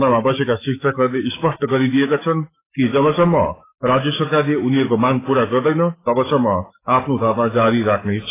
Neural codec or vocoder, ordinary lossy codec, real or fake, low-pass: autoencoder, 48 kHz, 128 numbers a frame, DAC-VAE, trained on Japanese speech; AAC, 16 kbps; fake; 3.6 kHz